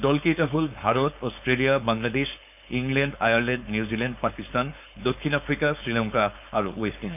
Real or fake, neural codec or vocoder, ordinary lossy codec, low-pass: fake; codec, 16 kHz, 4.8 kbps, FACodec; none; 3.6 kHz